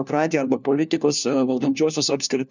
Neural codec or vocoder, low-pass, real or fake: codec, 16 kHz, 1 kbps, FunCodec, trained on LibriTTS, 50 frames a second; 7.2 kHz; fake